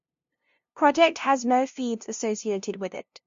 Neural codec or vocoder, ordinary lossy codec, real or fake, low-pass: codec, 16 kHz, 0.5 kbps, FunCodec, trained on LibriTTS, 25 frames a second; MP3, 48 kbps; fake; 7.2 kHz